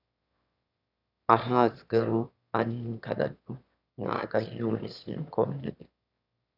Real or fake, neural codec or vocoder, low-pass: fake; autoencoder, 22.05 kHz, a latent of 192 numbers a frame, VITS, trained on one speaker; 5.4 kHz